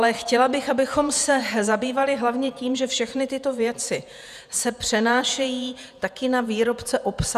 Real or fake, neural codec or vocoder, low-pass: fake; vocoder, 48 kHz, 128 mel bands, Vocos; 14.4 kHz